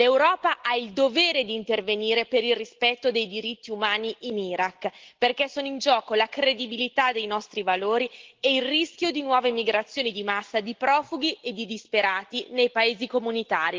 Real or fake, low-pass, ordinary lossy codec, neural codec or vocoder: real; 7.2 kHz; Opus, 16 kbps; none